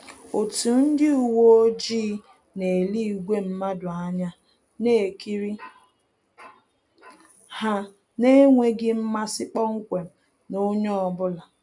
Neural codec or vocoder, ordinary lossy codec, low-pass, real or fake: none; none; 10.8 kHz; real